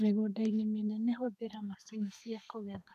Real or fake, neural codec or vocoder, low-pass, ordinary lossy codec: fake; codec, 32 kHz, 1.9 kbps, SNAC; 14.4 kHz; none